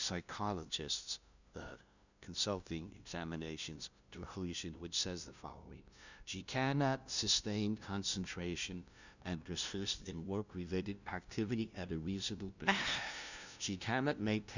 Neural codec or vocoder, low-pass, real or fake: codec, 16 kHz, 0.5 kbps, FunCodec, trained on LibriTTS, 25 frames a second; 7.2 kHz; fake